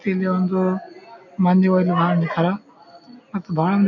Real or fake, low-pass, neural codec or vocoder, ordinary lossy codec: real; 7.2 kHz; none; none